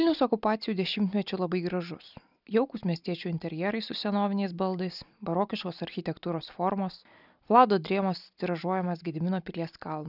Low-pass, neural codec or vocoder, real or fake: 5.4 kHz; none; real